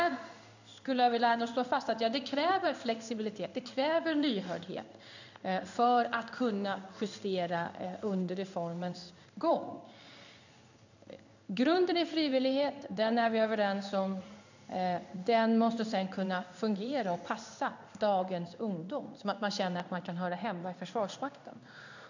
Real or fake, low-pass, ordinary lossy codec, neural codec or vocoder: fake; 7.2 kHz; none; codec, 16 kHz in and 24 kHz out, 1 kbps, XY-Tokenizer